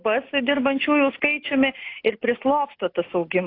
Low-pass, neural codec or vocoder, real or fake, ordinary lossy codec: 5.4 kHz; none; real; AAC, 32 kbps